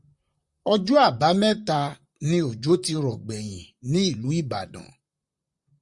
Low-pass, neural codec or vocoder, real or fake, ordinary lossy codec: 10.8 kHz; vocoder, 44.1 kHz, 128 mel bands, Pupu-Vocoder; fake; Opus, 64 kbps